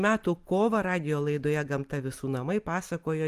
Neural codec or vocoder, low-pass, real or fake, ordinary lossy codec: vocoder, 44.1 kHz, 128 mel bands every 256 samples, BigVGAN v2; 14.4 kHz; fake; Opus, 32 kbps